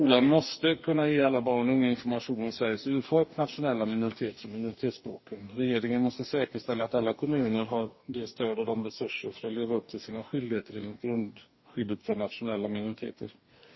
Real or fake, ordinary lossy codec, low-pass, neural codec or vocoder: fake; MP3, 24 kbps; 7.2 kHz; codec, 44.1 kHz, 2.6 kbps, DAC